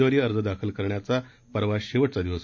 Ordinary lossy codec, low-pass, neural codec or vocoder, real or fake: MP3, 64 kbps; 7.2 kHz; none; real